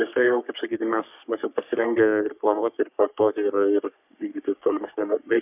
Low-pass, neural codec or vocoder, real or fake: 3.6 kHz; codec, 44.1 kHz, 3.4 kbps, Pupu-Codec; fake